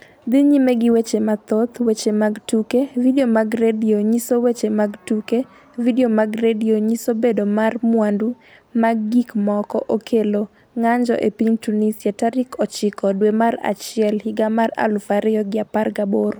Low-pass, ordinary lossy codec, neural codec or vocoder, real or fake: none; none; none; real